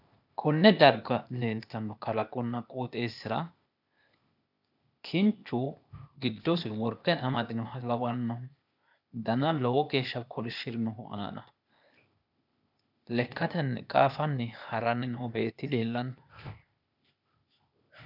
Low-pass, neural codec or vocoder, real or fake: 5.4 kHz; codec, 16 kHz, 0.8 kbps, ZipCodec; fake